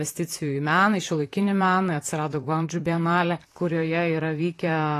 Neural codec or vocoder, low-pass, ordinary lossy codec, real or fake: vocoder, 44.1 kHz, 128 mel bands, Pupu-Vocoder; 14.4 kHz; AAC, 48 kbps; fake